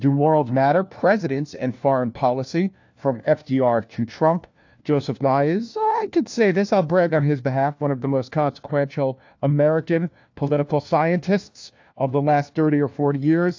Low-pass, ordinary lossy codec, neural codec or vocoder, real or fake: 7.2 kHz; AAC, 48 kbps; codec, 16 kHz, 1 kbps, FunCodec, trained on LibriTTS, 50 frames a second; fake